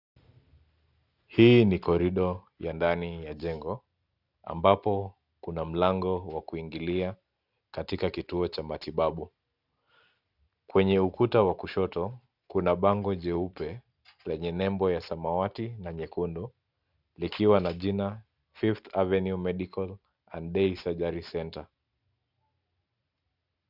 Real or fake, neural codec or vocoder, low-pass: real; none; 5.4 kHz